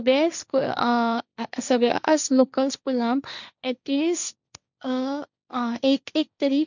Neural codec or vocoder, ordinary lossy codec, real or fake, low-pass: codec, 16 kHz, 1.1 kbps, Voila-Tokenizer; none; fake; 7.2 kHz